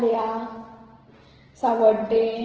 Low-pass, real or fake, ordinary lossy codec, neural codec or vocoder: 7.2 kHz; real; Opus, 16 kbps; none